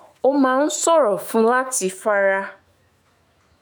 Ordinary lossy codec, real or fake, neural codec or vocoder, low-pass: none; fake; autoencoder, 48 kHz, 128 numbers a frame, DAC-VAE, trained on Japanese speech; none